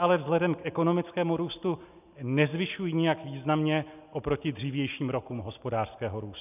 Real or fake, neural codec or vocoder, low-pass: real; none; 3.6 kHz